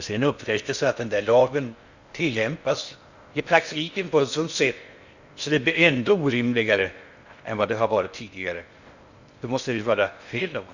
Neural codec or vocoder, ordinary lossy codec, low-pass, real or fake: codec, 16 kHz in and 24 kHz out, 0.6 kbps, FocalCodec, streaming, 2048 codes; Opus, 64 kbps; 7.2 kHz; fake